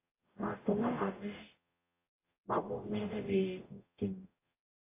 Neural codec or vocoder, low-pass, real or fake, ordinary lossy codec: codec, 44.1 kHz, 0.9 kbps, DAC; 3.6 kHz; fake; AAC, 24 kbps